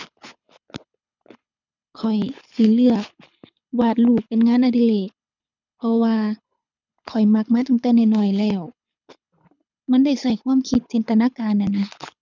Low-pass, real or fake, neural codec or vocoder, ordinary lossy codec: 7.2 kHz; fake; codec, 24 kHz, 6 kbps, HILCodec; none